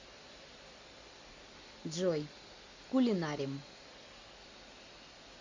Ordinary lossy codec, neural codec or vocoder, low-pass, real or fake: MP3, 48 kbps; none; 7.2 kHz; real